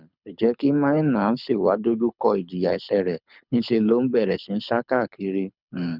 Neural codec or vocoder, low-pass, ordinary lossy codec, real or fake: codec, 24 kHz, 6 kbps, HILCodec; 5.4 kHz; none; fake